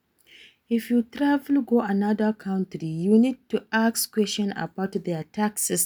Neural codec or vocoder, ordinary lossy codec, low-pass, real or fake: none; none; none; real